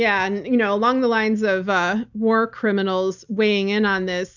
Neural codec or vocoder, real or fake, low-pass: none; real; 7.2 kHz